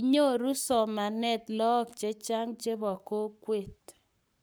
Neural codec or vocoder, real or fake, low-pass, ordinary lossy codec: codec, 44.1 kHz, 7.8 kbps, Pupu-Codec; fake; none; none